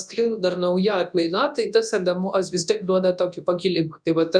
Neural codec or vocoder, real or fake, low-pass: codec, 24 kHz, 0.9 kbps, WavTokenizer, large speech release; fake; 9.9 kHz